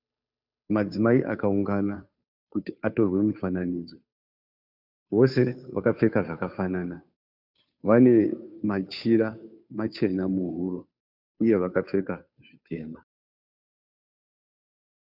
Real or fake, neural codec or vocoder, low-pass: fake; codec, 16 kHz, 2 kbps, FunCodec, trained on Chinese and English, 25 frames a second; 5.4 kHz